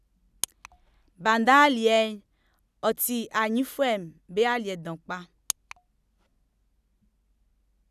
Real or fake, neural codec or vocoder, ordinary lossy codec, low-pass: real; none; none; 14.4 kHz